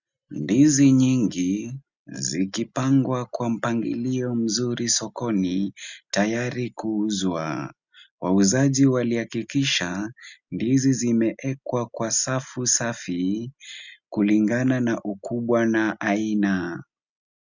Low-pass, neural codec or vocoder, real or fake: 7.2 kHz; none; real